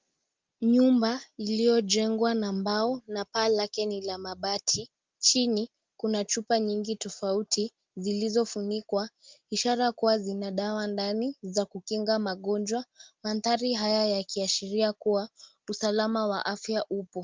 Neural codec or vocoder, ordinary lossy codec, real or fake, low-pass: none; Opus, 32 kbps; real; 7.2 kHz